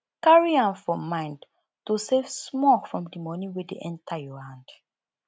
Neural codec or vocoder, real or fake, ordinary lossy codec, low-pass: none; real; none; none